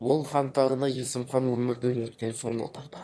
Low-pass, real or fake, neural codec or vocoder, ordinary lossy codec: none; fake; autoencoder, 22.05 kHz, a latent of 192 numbers a frame, VITS, trained on one speaker; none